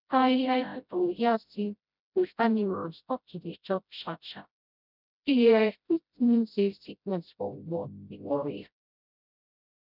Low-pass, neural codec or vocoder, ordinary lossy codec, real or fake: 5.4 kHz; codec, 16 kHz, 0.5 kbps, FreqCodec, smaller model; none; fake